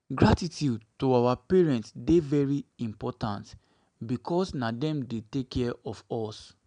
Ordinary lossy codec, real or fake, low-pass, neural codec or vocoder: none; real; 9.9 kHz; none